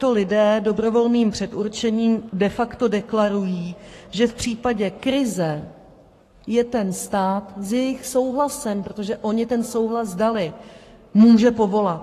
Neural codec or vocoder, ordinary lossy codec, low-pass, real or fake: codec, 44.1 kHz, 7.8 kbps, Pupu-Codec; AAC, 48 kbps; 14.4 kHz; fake